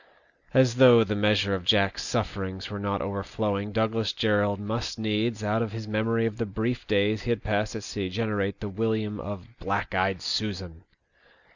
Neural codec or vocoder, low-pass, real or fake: none; 7.2 kHz; real